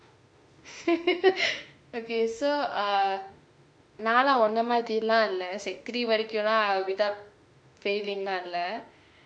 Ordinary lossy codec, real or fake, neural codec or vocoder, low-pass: MP3, 64 kbps; fake; autoencoder, 48 kHz, 32 numbers a frame, DAC-VAE, trained on Japanese speech; 9.9 kHz